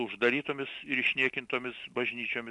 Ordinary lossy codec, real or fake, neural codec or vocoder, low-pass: MP3, 64 kbps; real; none; 10.8 kHz